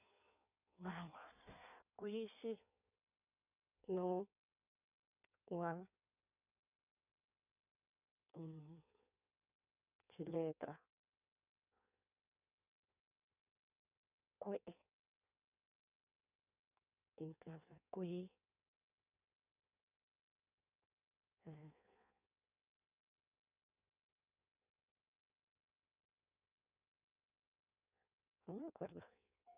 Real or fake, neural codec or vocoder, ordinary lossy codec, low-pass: fake; codec, 16 kHz in and 24 kHz out, 1.1 kbps, FireRedTTS-2 codec; none; 3.6 kHz